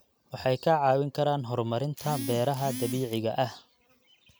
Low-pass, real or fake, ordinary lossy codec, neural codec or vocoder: none; real; none; none